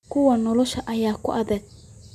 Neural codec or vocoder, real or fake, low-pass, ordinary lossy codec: none; real; 14.4 kHz; none